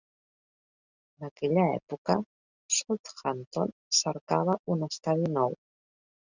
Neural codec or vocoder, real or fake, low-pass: none; real; 7.2 kHz